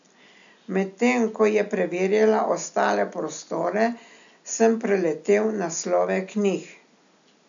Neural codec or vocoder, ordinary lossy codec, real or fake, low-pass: none; none; real; 7.2 kHz